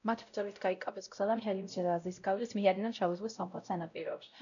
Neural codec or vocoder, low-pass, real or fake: codec, 16 kHz, 0.5 kbps, X-Codec, WavLM features, trained on Multilingual LibriSpeech; 7.2 kHz; fake